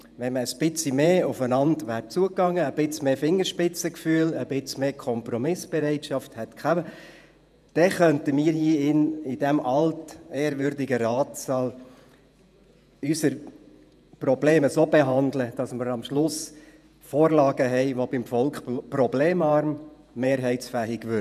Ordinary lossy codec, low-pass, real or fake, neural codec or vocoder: none; 14.4 kHz; fake; vocoder, 48 kHz, 128 mel bands, Vocos